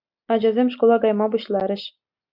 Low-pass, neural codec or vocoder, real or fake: 5.4 kHz; none; real